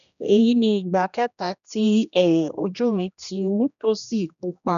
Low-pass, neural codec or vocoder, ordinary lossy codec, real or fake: 7.2 kHz; codec, 16 kHz, 1 kbps, X-Codec, HuBERT features, trained on general audio; none; fake